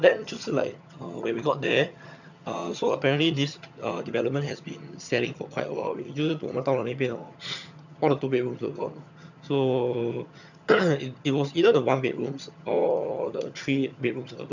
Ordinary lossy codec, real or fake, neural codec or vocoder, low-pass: none; fake; vocoder, 22.05 kHz, 80 mel bands, HiFi-GAN; 7.2 kHz